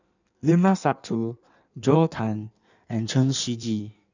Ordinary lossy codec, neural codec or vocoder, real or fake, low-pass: none; codec, 16 kHz in and 24 kHz out, 1.1 kbps, FireRedTTS-2 codec; fake; 7.2 kHz